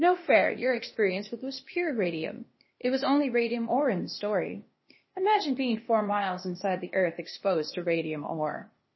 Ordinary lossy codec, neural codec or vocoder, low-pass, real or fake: MP3, 24 kbps; codec, 16 kHz, 0.8 kbps, ZipCodec; 7.2 kHz; fake